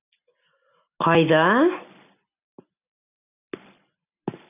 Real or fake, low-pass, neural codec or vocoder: real; 3.6 kHz; none